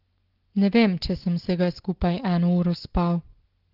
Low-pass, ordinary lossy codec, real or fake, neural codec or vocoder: 5.4 kHz; Opus, 16 kbps; real; none